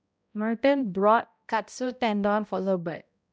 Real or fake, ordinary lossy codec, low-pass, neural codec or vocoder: fake; none; none; codec, 16 kHz, 0.5 kbps, X-Codec, HuBERT features, trained on balanced general audio